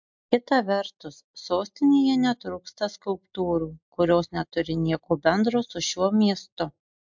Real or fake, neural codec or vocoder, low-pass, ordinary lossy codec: real; none; 7.2 kHz; MP3, 64 kbps